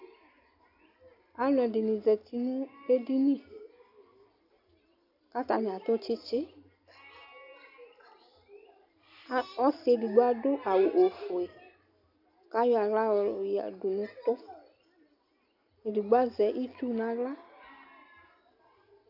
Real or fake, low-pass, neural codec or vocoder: real; 5.4 kHz; none